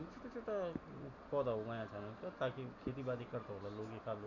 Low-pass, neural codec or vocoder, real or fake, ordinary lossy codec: 7.2 kHz; none; real; none